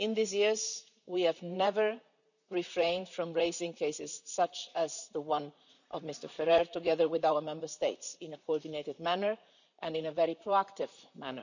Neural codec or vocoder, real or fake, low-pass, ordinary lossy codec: vocoder, 44.1 kHz, 128 mel bands, Pupu-Vocoder; fake; 7.2 kHz; none